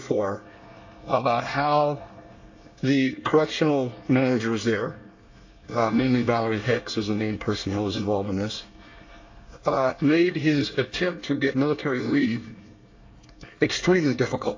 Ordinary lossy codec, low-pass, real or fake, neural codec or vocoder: AAC, 48 kbps; 7.2 kHz; fake; codec, 24 kHz, 1 kbps, SNAC